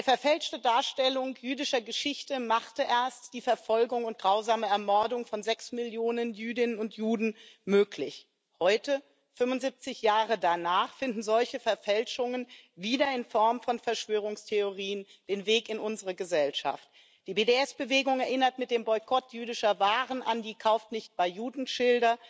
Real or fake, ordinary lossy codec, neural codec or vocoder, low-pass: real; none; none; none